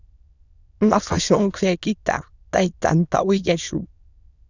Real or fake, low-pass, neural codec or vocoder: fake; 7.2 kHz; autoencoder, 22.05 kHz, a latent of 192 numbers a frame, VITS, trained on many speakers